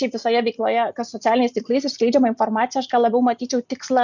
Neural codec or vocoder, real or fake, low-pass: none; real; 7.2 kHz